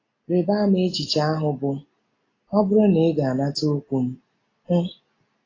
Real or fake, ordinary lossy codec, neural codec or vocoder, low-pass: real; AAC, 32 kbps; none; 7.2 kHz